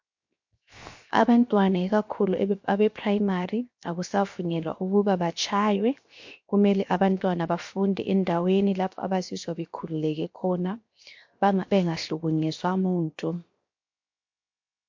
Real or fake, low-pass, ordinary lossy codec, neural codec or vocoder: fake; 7.2 kHz; MP3, 48 kbps; codec, 16 kHz, 0.7 kbps, FocalCodec